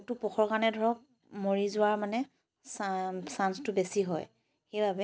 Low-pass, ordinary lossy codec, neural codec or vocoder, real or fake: none; none; none; real